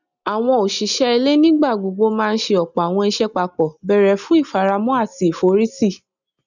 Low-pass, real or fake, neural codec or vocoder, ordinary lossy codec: 7.2 kHz; real; none; none